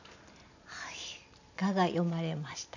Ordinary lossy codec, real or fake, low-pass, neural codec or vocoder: none; real; 7.2 kHz; none